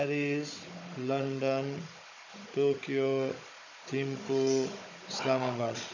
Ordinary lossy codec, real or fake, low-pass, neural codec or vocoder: AAC, 48 kbps; fake; 7.2 kHz; codec, 16 kHz, 16 kbps, FunCodec, trained on Chinese and English, 50 frames a second